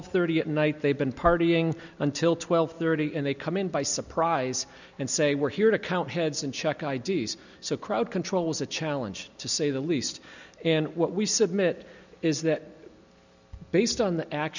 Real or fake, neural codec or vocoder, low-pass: real; none; 7.2 kHz